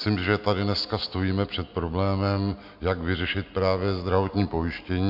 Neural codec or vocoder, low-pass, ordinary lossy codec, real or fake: none; 5.4 kHz; MP3, 48 kbps; real